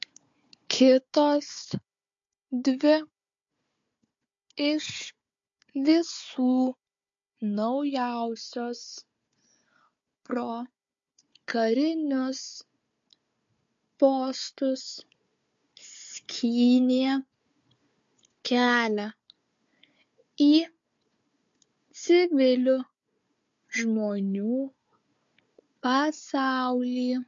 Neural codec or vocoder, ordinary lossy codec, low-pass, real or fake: codec, 16 kHz, 4 kbps, FunCodec, trained on Chinese and English, 50 frames a second; MP3, 48 kbps; 7.2 kHz; fake